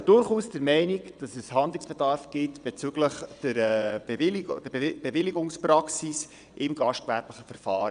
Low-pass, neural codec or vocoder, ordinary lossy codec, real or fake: 9.9 kHz; vocoder, 22.05 kHz, 80 mel bands, WaveNeXt; none; fake